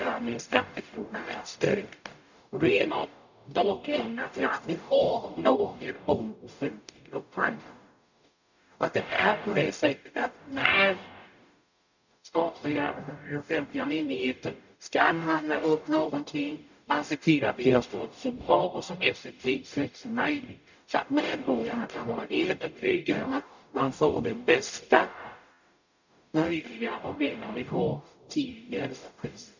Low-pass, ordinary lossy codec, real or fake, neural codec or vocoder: 7.2 kHz; none; fake; codec, 44.1 kHz, 0.9 kbps, DAC